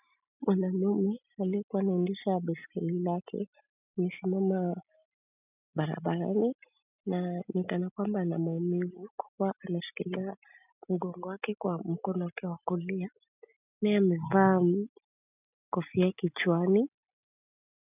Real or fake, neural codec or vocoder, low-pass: real; none; 3.6 kHz